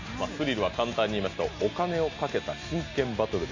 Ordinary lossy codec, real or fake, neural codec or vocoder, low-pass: AAC, 48 kbps; real; none; 7.2 kHz